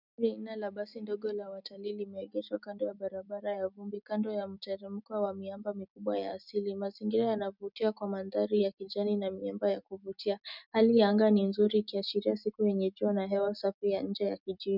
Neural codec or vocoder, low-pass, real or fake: none; 5.4 kHz; real